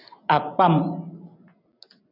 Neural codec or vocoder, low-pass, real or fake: none; 5.4 kHz; real